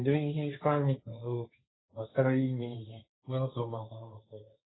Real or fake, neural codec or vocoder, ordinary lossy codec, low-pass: fake; codec, 16 kHz, 1.1 kbps, Voila-Tokenizer; AAC, 16 kbps; 7.2 kHz